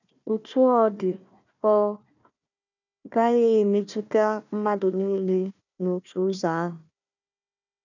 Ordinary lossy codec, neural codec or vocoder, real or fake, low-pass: none; codec, 16 kHz, 1 kbps, FunCodec, trained on Chinese and English, 50 frames a second; fake; 7.2 kHz